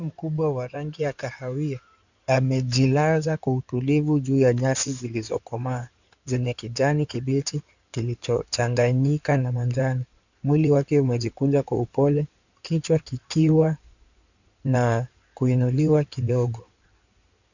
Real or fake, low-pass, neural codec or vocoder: fake; 7.2 kHz; codec, 16 kHz in and 24 kHz out, 2.2 kbps, FireRedTTS-2 codec